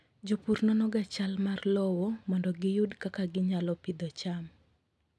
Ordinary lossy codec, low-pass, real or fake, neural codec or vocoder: none; none; real; none